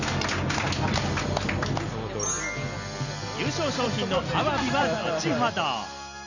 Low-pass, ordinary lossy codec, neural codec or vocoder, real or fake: 7.2 kHz; none; none; real